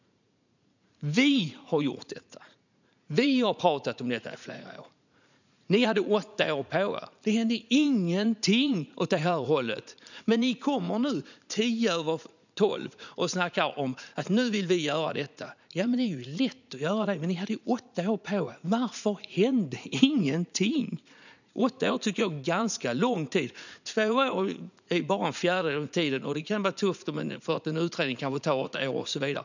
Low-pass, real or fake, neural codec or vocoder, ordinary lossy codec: 7.2 kHz; fake; vocoder, 44.1 kHz, 80 mel bands, Vocos; none